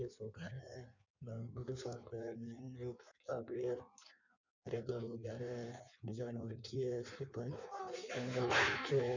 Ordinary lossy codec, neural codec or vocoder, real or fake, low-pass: none; codec, 16 kHz in and 24 kHz out, 1.1 kbps, FireRedTTS-2 codec; fake; 7.2 kHz